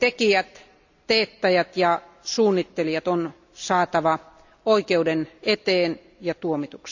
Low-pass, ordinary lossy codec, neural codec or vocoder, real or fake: 7.2 kHz; none; none; real